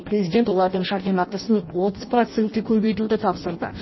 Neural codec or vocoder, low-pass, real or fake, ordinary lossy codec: codec, 16 kHz in and 24 kHz out, 0.6 kbps, FireRedTTS-2 codec; 7.2 kHz; fake; MP3, 24 kbps